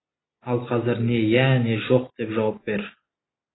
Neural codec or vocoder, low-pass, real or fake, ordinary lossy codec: none; 7.2 kHz; real; AAC, 16 kbps